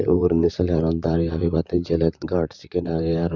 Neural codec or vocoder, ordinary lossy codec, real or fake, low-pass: vocoder, 44.1 kHz, 128 mel bands, Pupu-Vocoder; none; fake; 7.2 kHz